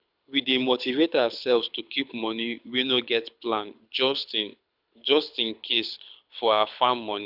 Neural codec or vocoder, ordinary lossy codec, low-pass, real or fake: codec, 24 kHz, 6 kbps, HILCodec; none; 5.4 kHz; fake